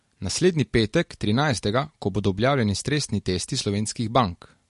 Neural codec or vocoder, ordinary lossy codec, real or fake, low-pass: none; MP3, 48 kbps; real; 14.4 kHz